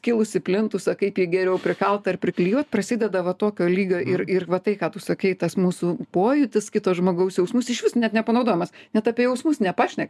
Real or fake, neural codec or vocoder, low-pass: real; none; 14.4 kHz